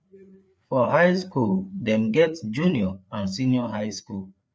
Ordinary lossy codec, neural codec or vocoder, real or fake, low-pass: none; codec, 16 kHz, 4 kbps, FreqCodec, larger model; fake; none